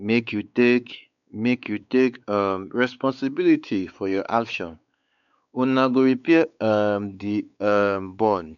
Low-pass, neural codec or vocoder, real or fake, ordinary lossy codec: 7.2 kHz; codec, 16 kHz, 4 kbps, X-Codec, WavLM features, trained on Multilingual LibriSpeech; fake; none